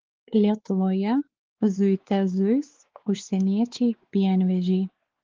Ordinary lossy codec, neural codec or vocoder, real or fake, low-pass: Opus, 16 kbps; codec, 16 kHz, 4 kbps, X-Codec, WavLM features, trained on Multilingual LibriSpeech; fake; 7.2 kHz